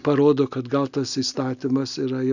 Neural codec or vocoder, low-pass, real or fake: none; 7.2 kHz; real